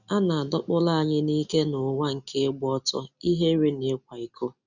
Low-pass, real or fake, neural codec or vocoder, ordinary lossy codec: 7.2 kHz; real; none; none